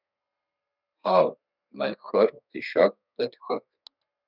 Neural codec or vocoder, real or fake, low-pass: codec, 32 kHz, 1.9 kbps, SNAC; fake; 5.4 kHz